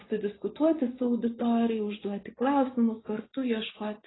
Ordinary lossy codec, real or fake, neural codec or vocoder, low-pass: AAC, 16 kbps; fake; vocoder, 24 kHz, 100 mel bands, Vocos; 7.2 kHz